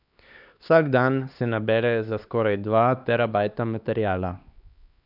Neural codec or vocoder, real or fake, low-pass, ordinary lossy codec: codec, 16 kHz, 2 kbps, X-Codec, HuBERT features, trained on LibriSpeech; fake; 5.4 kHz; none